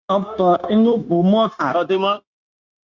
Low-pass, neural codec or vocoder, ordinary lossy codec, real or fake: 7.2 kHz; codec, 16 kHz, 0.9 kbps, LongCat-Audio-Codec; Opus, 64 kbps; fake